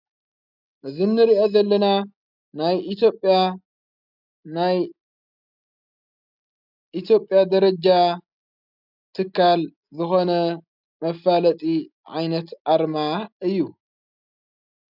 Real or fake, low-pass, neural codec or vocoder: real; 5.4 kHz; none